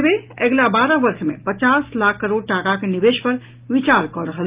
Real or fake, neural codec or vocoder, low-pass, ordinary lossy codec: real; none; 3.6 kHz; Opus, 32 kbps